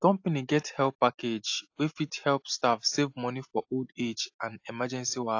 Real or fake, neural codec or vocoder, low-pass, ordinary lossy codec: real; none; 7.2 kHz; AAC, 48 kbps